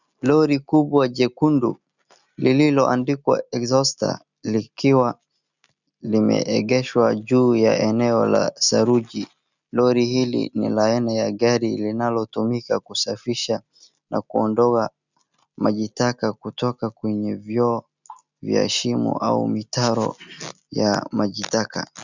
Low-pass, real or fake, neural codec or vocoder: 7.2 kHz; real; none